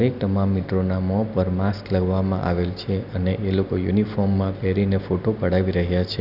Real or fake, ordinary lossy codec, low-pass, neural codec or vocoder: real; none; 5.4 kHz; none